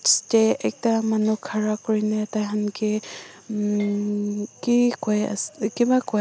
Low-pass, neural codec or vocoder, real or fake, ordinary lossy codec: none; none; real; none